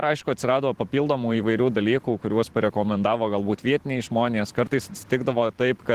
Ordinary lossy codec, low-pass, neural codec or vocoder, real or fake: Opus, 16 kbps; 14.4 kHz; vocoder, 44.1 kHz, 128 mel bands every 512 samples, BigVGAN v2; fake